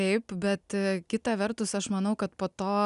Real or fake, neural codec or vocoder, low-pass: real; none; 10.8 kHz